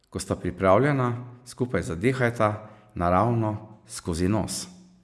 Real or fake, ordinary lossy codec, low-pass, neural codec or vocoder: real; none; none; none